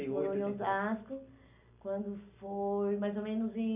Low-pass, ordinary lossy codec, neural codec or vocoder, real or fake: 3.6 kHz; none; none; real